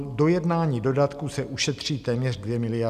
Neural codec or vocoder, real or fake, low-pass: none; real; 14.4 kHz